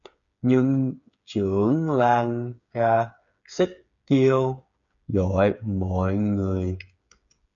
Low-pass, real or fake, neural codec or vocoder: 7.2 kHz; fake; codec, 16 kHz, 8 kbps, FreqCodec, smaller model